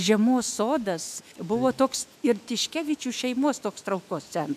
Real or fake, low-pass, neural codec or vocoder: fake; 14.4 kHz; autoencoder, 48 kHz, 128 numbers a frame, DAC-VAE, trained on Japanese speech